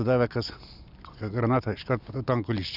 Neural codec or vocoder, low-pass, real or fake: none; 5.4 kHz; real